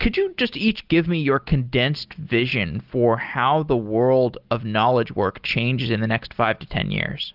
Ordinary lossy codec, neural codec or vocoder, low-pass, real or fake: Opus, 32 kbps; none; 5.4 kHz; real